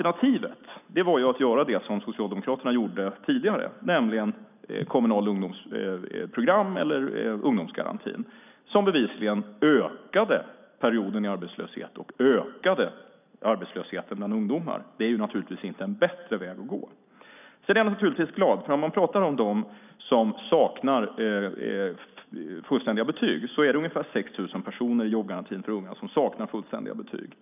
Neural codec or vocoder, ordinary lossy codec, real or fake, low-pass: none; none; real; 3.6 kHz